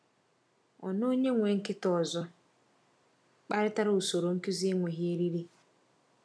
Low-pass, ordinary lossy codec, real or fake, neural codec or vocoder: none; none; real; none